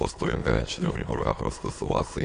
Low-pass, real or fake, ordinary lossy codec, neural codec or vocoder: 9.9 kHz; fake; AAC, 48 kbps; autoencoder, 22.05 kHz, a latent of 192 numbers a frame, VITS, trained on many speakers